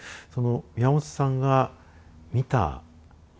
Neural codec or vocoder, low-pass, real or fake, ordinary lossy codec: none; none; real; none